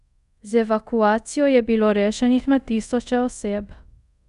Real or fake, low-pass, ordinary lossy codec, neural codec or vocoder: fake; 10.8 kHz; none; codec, 24 kHz, 0.5 kbps, DualCodec